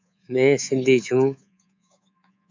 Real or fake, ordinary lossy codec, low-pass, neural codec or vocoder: fake; MP3, 64 kbps; 7.2 kHz; codec, 24 kHz, 3.1 kbps, DualCodec